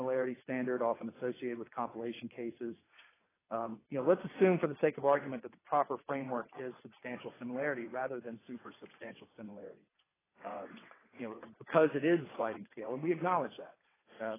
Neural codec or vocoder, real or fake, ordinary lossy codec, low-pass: vocoder, 22.05 kHz, 80 mel bands, WaveNeXt; fake; AAC, 16 kbps; 3.6 kHz